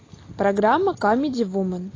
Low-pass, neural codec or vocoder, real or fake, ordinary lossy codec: 7.2 kHz; none; real; AAC, 32 kbps